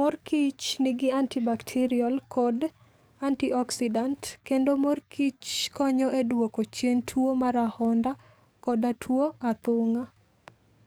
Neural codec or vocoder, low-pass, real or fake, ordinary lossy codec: codec, 44.1 kHz, 7.8 kbps, DAC; none; fake; none